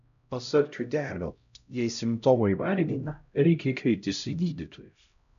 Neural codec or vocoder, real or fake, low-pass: codec, 16 kHz, 0.5 kbps, X-Codec, HuBERT features, trained on LibriSpeech; fake; 7.2 kHz